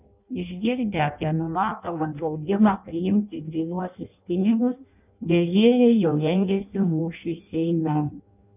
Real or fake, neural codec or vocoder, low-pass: fake; codec, 16 kHz in and 24 kHz out, 0.6 kbps, FireRedTTS-2 codec; 3.6 kHz